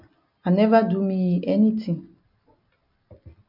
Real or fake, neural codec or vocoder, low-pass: real; none; 5.4 kHz